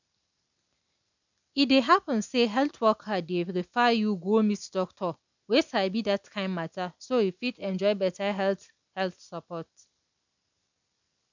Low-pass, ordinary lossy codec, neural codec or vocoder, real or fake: 7.2 kHz; none; none; real